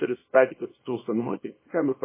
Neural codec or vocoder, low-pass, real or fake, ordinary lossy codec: codec, 24 kHz, 0.9 kbps, WavTokenizer, small release; 3.6 kHz; fake; MP3, 16 kbps